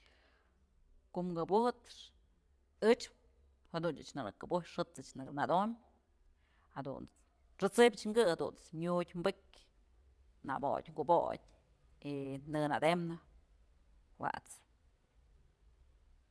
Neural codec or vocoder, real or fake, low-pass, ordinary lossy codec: vocoder, 22.05 kHz, 80 mel bands, Vocos; fake; none; none